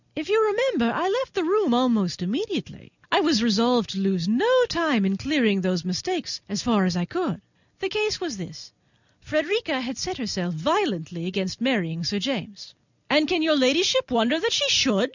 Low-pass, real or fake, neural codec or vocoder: 7.2 kHz; real; none